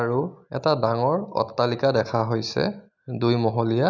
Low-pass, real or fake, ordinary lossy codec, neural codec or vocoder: 7.2 kHz; real; none; none